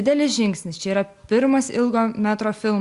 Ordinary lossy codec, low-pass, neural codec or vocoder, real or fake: Opus, 64 kbps; 10.8 kHz; none; real